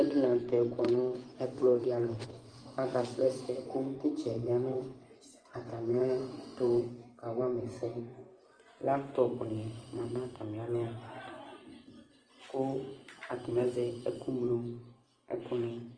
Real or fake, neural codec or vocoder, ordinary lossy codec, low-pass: fake; vocoder, 24 kHz, 100 mel bands, Vocos; Opus, 24 kbps; 9.9 kHz